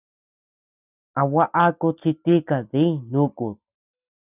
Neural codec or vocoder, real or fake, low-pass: none; real; 3.6 kHz